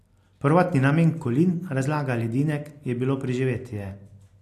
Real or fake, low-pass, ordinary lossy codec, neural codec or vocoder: real; 14.4 kHz; AAC, 64 kbps; none